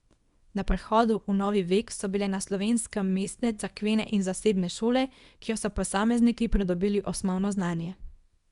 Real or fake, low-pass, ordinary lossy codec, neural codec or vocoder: fake; 10.8 kHz; Opus, 64 kbps; codec, 24 kHz, 0.9 kbps, WavTokenizer, small release